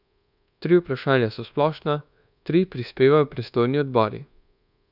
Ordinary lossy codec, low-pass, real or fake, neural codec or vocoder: none; 5.4 kHz; fake; codec, 24 kHz, 1.2 kbps, DualCodec